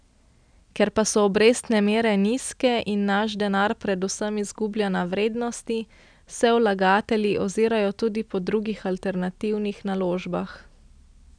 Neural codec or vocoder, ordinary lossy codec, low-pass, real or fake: none; none; 9.9 kHz; real